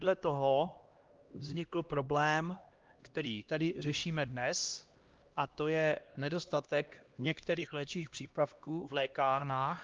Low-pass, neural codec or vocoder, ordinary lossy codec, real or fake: 7.2 kHz; codec, 16 kHz, 1 kbps, X-Codec, HuBERT features, trained on LibriSpeech; Opus, 32 kbps; fake